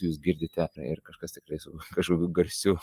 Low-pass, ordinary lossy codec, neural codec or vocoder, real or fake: 14.4 kHz; Opus, 32 kbps; vocoder, 44.1 kHz, 128 mel bands every 256 samples, BigVGAN v2; fake